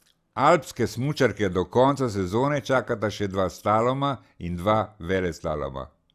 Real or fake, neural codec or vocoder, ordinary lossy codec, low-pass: real; none; Opus, 64 kbps; 14.4 kHz